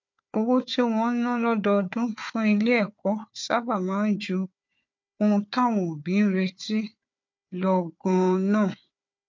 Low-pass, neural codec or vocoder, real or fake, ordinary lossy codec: 7.2 kHz; codec, 16 kHz, 4 kbps, FunCodec, trained on Chinese and English, 50 frames a second; fake; MP3, 48 kbps